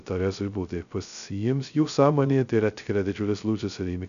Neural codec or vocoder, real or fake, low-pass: codec, 16 kHz, 0.2 kbps, FocalCodec; fake; 7.2 kHz